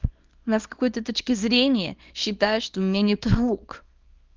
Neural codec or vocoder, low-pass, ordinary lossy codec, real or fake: codec, 24 kHz, 0.9 kbps, WavTokenizer, small release; 7.2 kHz; Opus, 24 kbps; fake